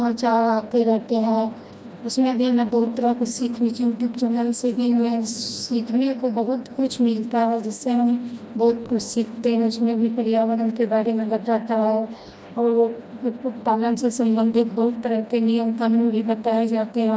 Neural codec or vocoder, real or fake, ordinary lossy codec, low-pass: codec, 16 kHz, 1 kbps, FreqCodec, smaller model; fake; none; none